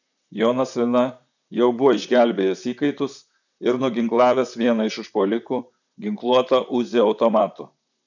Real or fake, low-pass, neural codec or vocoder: fake; 7.2 kHz; vocoder, 44.1 kHz, 128 mel bands, Pupu-Vocoder